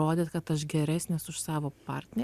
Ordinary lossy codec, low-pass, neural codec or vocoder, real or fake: Opus, 64 kbps; 14.4 kHz; vocoder, 44.1 kHz, 128 mel bands every 512 samples, BigVGAN v2; fake